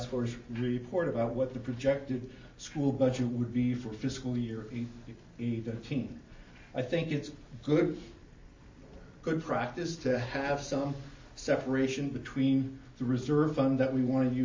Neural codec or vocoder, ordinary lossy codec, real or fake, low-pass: none; MP3, 32 kbps; real; 7.2 kHz